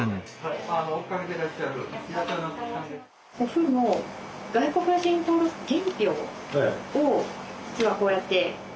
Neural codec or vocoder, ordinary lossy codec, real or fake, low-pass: none; none; real; none